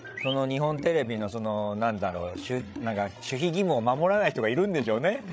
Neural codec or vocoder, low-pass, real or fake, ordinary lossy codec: codec, 16 kHz, 16 kbps, FreqCodec, larger model; none; fake; none